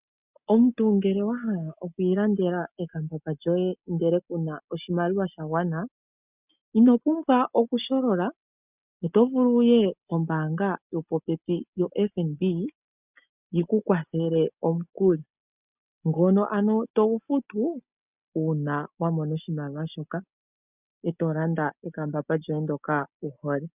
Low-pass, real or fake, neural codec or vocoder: 3.6 kHz; real; none